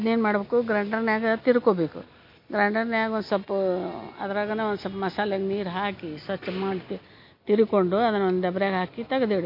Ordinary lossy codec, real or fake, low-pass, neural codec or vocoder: MP3, 32 kbps; real; 5.4 kHz; none